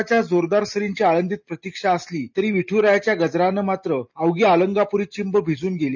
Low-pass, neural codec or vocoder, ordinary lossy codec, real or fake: 7.2 kHz; none; none; real